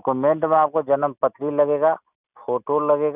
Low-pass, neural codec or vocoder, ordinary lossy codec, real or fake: 3.6 kHz; none; none; real